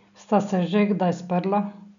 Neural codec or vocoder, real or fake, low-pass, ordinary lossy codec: none; real; 7.2 kHz; none